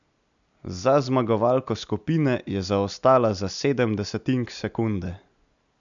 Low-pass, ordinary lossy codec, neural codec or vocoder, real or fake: 7.2 kHz; none; none; real